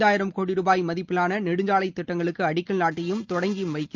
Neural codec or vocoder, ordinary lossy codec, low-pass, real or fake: none; Opus, 32 kbps; 7.2 kHz; real